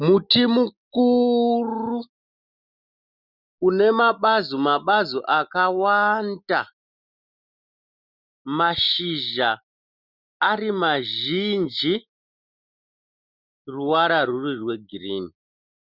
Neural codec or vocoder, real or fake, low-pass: none; real; 5.4 kHz